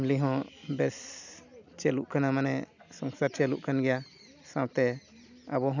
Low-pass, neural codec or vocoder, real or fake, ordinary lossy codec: 7.2 kHz; none; real; none